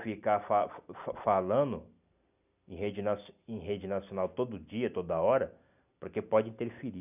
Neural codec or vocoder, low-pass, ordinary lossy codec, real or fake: none; 3.6 kHz; none; real